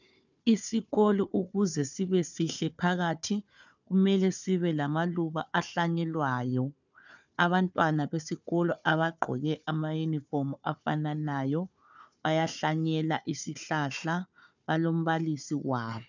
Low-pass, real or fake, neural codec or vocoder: 7.2 kHz; fake; codec, 16 kHz, 4 kbps, FunCodec, trained on Chinese and English, 50 frames a second